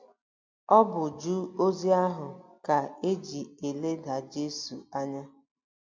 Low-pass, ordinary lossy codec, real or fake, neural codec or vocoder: 7.2 kHz; MP3, 48 kbps; real; none